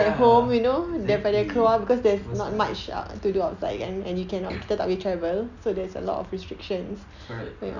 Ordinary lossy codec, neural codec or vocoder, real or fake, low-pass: none; none; real; 7.2 kHz